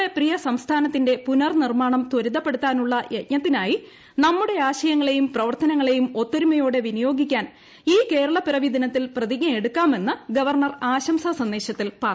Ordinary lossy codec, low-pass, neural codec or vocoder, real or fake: none; none; none; real